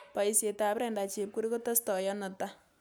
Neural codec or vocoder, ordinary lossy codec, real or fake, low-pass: none; none; real; none